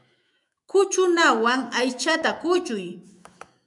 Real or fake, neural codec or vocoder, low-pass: fake; autoencoder, 48 kHz, 128 numbers a frame, DAC-VAE, trained on Japanese speech; 10.8 kHz